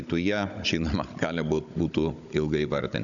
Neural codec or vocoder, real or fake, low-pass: codec, 16 kHz, 16 kbps, FunCodec, trained on Chinese and English, 50 frames a second; fake; 7.2 kHz